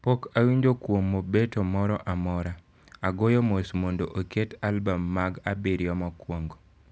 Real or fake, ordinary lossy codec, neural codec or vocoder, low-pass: real; none; none; none